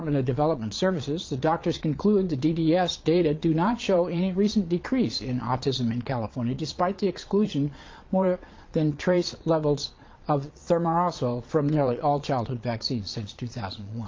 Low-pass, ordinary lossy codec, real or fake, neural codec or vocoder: 7.2 kHz; Opus, 32 kbps; fake; vocoder, 22.05 kHz, 80 mel bands, Vocos